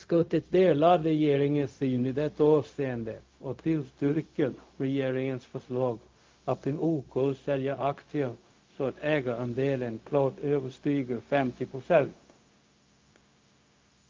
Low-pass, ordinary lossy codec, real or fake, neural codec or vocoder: 7.2 kHz; Opus, 32 kbps; fake; codec, 16 kHz, 0.4 kbps, LongCat-Audio-Codec